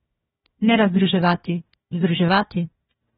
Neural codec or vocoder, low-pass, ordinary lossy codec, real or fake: codec, 16 kHz, 1 kbps, FunCodec, trained on Chinese and English, 50 frames a second; 7.2 kHz; AAC, 16 kbps; fake